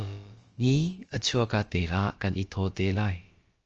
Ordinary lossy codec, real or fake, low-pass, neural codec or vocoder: Opus, 24 kbps; fake; 7.2 kHz; codec, 16 kHz, about 1 kbps, DyCAST, with the encoder's durations